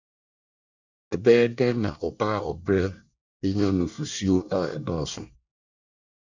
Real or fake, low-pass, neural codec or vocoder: fake; 7.2 kHz; codec, 24 kHz, 1 kbps, SNAC